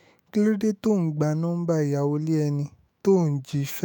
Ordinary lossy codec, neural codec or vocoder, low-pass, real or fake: none; autoencoder, 48 kHz, 128 numbers a frame, DAC-VAE, trained on Japanese speech; none; fake